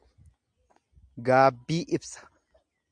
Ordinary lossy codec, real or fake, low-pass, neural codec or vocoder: MP3, 96 kbps; real; 9.9 kHz; none